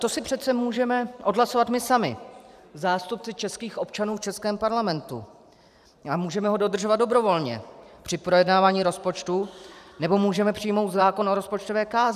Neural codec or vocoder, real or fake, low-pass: vocoder, 44.1 kHz, 128 mel bands every 512 samples, BigVGAN v2; fake; 14.4 kHz